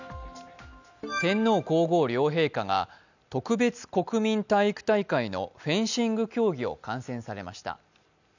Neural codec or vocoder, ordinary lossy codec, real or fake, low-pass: none; none; real; 7.2 kHz